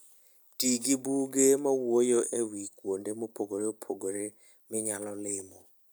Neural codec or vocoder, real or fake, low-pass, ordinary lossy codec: none; real; none; none